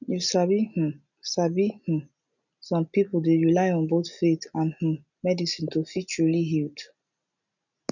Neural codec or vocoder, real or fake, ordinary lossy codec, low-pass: none; real; none; 7.2 kHz